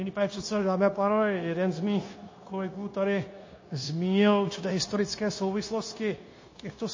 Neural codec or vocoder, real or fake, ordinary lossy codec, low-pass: codec, 16 kHz, 0.9 kbps, LongCat-Audio-Codec; fake; MP3, 32 kbps; 7.2 kHz